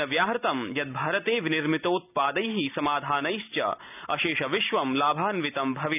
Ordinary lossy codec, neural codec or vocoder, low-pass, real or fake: none; none; 3.6 kHz; real